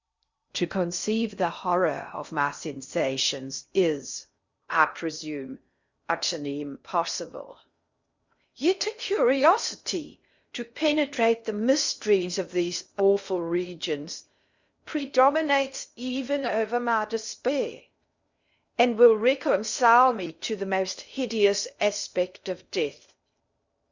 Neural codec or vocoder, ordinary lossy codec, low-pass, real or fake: codec, 16 kHz in and 24 kHz out, 0.6 kbps, FocalCodec, streaming, 2048 codes; Opus, 64 kbps; 7.2 kHz; fake